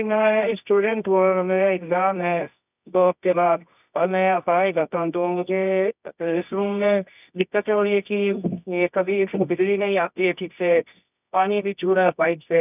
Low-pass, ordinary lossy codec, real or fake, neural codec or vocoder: 3.6 kHz; none; fake; codec, 24 kHz, 0.9 kbps, WavTokenizer, medium music audio release